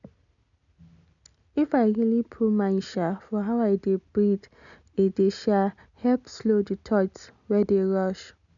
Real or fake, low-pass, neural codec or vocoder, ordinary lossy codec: real; 7.2 kHz; none; none